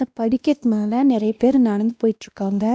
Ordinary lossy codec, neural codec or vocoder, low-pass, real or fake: none; codec, 16 kHz, 1 kbps, X-Codec, WavLM features, trained on Multilingual LibriSpeech; none; fake